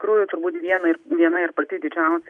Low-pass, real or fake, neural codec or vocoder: 10.8 kHz; real; none